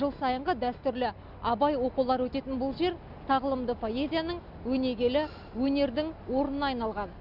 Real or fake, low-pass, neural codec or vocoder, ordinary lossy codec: real; 5.4 kHz; none; none